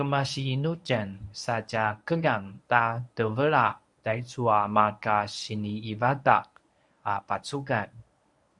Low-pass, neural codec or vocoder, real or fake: 10.8 kHz; codec, 24 kHz, 0.9 kbps, WavTokenizer, medium speech release version 1; fake